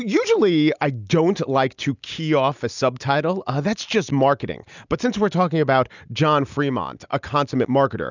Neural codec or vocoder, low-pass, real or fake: none; 7.2 kHz; real